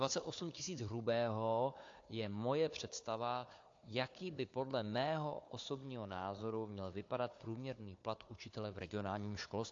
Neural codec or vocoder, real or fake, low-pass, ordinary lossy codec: codec, 16 kHz, 4 kbps, FunCodec, trained on Chinese and English, 50 frames a second; fake; 7.2 kHz; AAC, 48 kbps